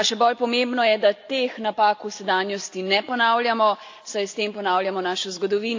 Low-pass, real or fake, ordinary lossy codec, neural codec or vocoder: 7.2 kHz; real; AAC, 48 kbps; none